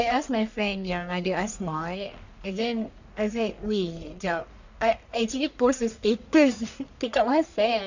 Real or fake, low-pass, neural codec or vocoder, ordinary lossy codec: fake; 7.2 kHz; codec, 44.1 kHz, 1.7 kbps, Pupu-Codec; none